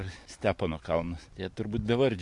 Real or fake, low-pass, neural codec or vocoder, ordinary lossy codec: real; 10.8 kHz; none; MP3, 64 kbps